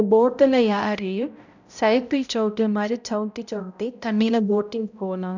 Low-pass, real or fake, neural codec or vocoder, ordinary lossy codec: 7.2 kHz; fake; codec, 16 kHz, 0.5 kbps, X-Codec, HuBERT features, trained on balanced general audio; none